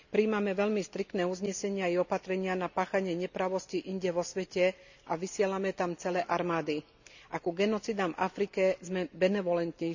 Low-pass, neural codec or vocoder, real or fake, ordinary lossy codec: 7.2 kHz; none; real; none